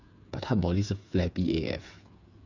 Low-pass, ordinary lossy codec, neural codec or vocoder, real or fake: 7.2 kHz; none; codec, 16 kHz, 8 kbps, FreqCodec, smaller model; fake